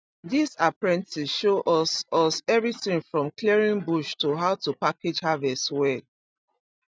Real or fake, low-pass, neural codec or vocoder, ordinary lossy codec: real; none; none; none